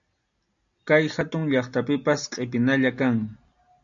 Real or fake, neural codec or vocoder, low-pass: real; none; 7.2 kHz